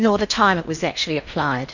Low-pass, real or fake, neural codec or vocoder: 7.2 kHz; fake; codec, 16 kHz in and 24 kHz out, 0.8 kbps, FocalCodec, streaming, 65536 codes